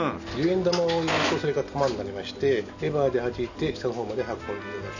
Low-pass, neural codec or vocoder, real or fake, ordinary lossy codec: 7.2 kHz; none; real; none